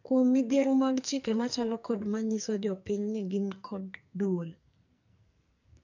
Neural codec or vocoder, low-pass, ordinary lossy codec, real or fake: codec, 44.1 kHz, 2.6 kbps, SNAC; 7.2 kHz; none; fake